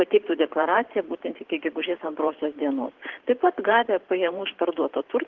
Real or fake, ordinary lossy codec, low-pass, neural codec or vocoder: fake; Opus, 16 kbps; 7.2 kHz; vocoder, 44.1 kHz, 128 mel bands every 512 samples, BigVGAN v2